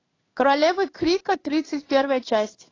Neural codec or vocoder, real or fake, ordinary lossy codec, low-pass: codec, 16 kHz in and 24 kHz out, 1 kbps, XY-Tokenizer; fake; AAC, 32 kbps; 7.2 kHz